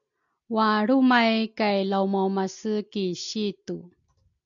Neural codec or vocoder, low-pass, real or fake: none; 7.2 kHz; real